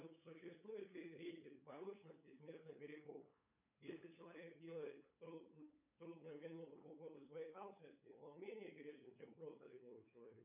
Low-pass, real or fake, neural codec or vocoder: 3.6 kHz; fake; codec, 16 kHz, 8 kbps, FunCodec, trained on LibriTTS, 25 frames a second